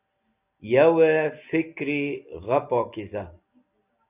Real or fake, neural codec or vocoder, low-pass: real; none; 3.6 kHz